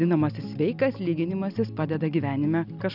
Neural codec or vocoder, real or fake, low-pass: none; real; 5.4 kHz